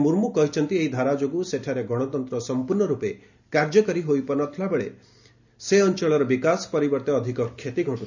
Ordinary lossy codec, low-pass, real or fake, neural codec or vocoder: none; 7.2 kHz; real; none